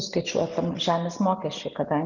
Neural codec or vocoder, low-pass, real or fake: none; 7.2 kHz; real